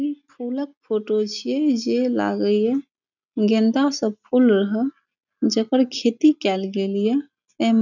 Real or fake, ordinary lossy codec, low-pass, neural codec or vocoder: real; none; 7.2 kHz; none